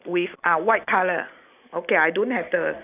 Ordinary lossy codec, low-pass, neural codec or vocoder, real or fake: none; 3.6 kHz; none; real